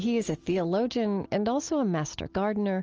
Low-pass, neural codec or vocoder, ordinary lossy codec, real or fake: 7.2 kHz; none; Opus, 24 kbps; real